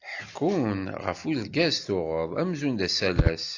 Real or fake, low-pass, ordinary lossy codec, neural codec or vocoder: real; 7.2 kHz; AAC, 48 kbps; none